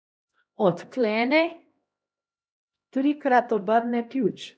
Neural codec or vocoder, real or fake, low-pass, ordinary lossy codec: codec, 16 kHz, 1 kbps, X-Codec, HuBERT features, trained on LibriSpeech; fake; none; none